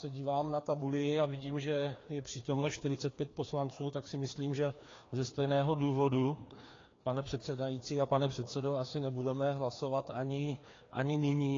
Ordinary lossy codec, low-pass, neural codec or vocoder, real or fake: AAC, 32 kbps; 7.2 kHz; codec, 16 kHz, 2 kbps, FreqCodec, larger model; fake